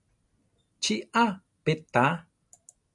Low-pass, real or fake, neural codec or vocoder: 10.8 kHz; real; none